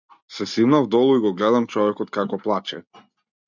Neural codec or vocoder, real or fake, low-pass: none; real; 7.2 kHz